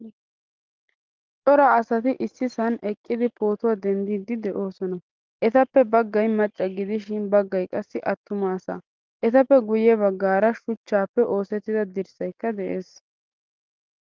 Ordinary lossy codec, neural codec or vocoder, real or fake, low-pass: Opus, 24 kbps; none; real; 7.2 kHz